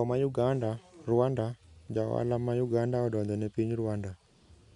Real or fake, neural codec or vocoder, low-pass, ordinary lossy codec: real; none; 10.8 kHz; none